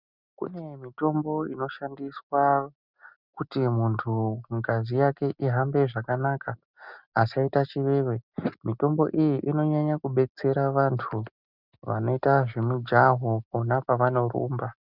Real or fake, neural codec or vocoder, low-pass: real; none; 5.4 kHz